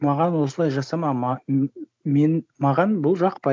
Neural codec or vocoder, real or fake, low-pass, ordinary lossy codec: none; real; none; none